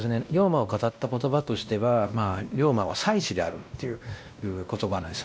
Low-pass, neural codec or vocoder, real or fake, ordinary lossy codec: none; codec, 16 kHz, 1 kbps, X-Codec, WavLM features, trained on Multilingual LibriSpeech; fake; none